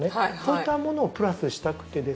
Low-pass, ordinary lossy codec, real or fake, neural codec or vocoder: none; none; real; none